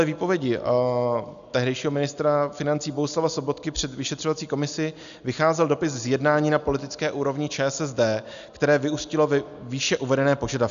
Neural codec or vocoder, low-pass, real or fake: none; 7.2 kHz; real